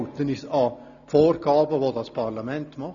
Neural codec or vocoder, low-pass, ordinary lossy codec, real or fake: none; 7.2 kHz; none; real